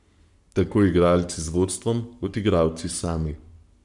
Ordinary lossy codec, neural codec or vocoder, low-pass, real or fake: none; codec, 44.1 kHz, 7.8 kbps, Pupu-Codec; 10.8 kHz; fake